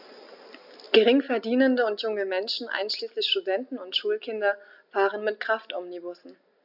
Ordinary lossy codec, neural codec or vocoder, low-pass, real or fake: none; none; 5.4 kHz; real